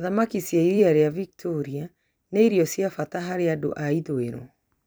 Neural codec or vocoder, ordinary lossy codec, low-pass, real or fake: vocoder, 44.1 kHz, 128 mel bands every 256 samples, BigVGAN v2; none; none; fake